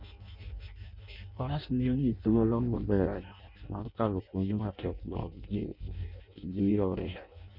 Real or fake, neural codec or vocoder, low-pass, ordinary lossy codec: fake; codec, 16 kHz in and 24 kHz out, 0.6 kbps, FireRedTTS-2 codec; 5.4 kHz; none